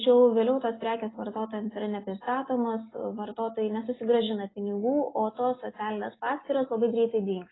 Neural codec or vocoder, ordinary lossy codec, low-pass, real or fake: none; AAC, 16 kbps; 7.2 kHz; real